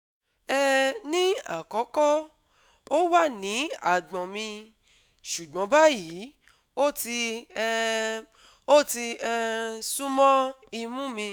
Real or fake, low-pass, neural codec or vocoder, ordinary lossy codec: fake; none; autoencoder, 48 kHz, 128 numbers a frame, DAC-VAE, trained on Japanese speech; none